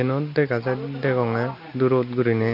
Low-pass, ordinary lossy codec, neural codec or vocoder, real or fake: 5.4 kHz; none; none; real